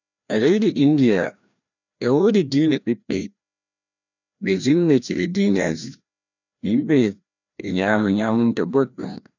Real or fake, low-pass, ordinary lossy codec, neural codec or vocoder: fake; 7.2 kHz; none; codec, 16 kHz, 1 kbps, FreqCodec, larger model